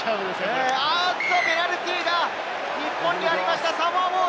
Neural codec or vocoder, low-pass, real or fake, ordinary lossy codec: none; none; real; none